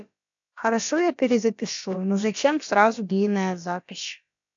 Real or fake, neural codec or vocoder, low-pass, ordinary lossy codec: fake; codec, 16 kHz, about 1 kbps, DyCAST, with the encoder's durations; 7.2 kHz; AAC, 64 kbps